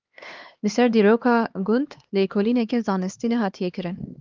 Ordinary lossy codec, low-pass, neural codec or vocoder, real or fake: Opus, 32 kbps; 7.2 kHz; codec, 16 kHz, 2 kbps, X-Codec, HuBERT features, trained on LibriSpeech; fake